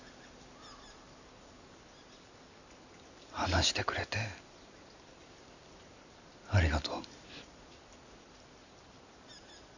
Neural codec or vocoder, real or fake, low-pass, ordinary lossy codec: none; real; 7.2 kHz; none